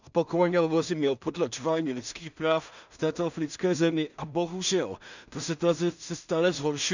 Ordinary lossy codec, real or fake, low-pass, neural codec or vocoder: none; fake; 7.2 kHz; codec, 16 kHz in and 24 kHz out, 0.4 kbps, LongCat-Audio-Codec, two codebook decoder